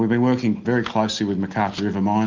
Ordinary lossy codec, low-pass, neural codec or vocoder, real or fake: Opus, 32 kbps; 7.2 kHz; none; real